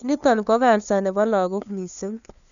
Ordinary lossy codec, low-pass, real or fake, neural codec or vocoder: none; 7.2 kHz; fake; codec, 16 kHz, 2 kbps, FunCodec, trained on LibriTTS, 25 frames a second